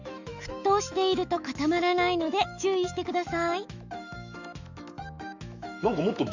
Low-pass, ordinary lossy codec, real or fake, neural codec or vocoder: 7.2 kHz; none; fake; autoencoder, 48 kHz, 128 numbers a frame, DAC-VAE, trained on Japanese speech